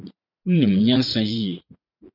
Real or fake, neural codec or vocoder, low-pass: fake; vocoder, 44.1 kHz, 80 mel bands, Vocos; 5.4 kHz